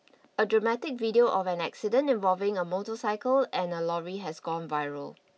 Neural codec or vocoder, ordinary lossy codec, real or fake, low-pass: none; none; real; none